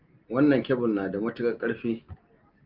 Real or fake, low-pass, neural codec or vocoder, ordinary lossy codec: real; 5.4 kHz; none; Opus, 24 kbps